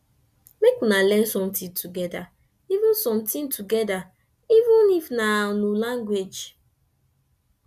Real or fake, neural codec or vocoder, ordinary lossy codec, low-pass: real; none; none; 14.4 kHz